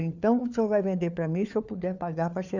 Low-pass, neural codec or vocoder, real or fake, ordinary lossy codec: 7.2 kHz; codec, 16 kHz, 4 kbps, FunCodec, trained on LibriTTS, 50 frames a second; fake; none